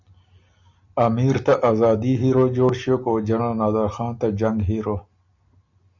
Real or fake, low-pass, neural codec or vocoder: real; 7.2 kHz; none